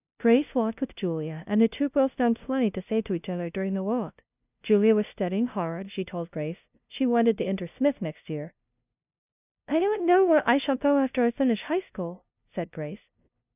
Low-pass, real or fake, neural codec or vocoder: 3.6 kHz; fake; codec, 16 kHz, 0.5 kbps, FunCodec, trained on LibriTTS, 25 frames a second